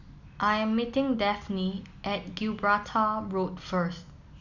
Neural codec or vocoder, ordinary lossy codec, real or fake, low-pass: none; none; real; 7.2 kHz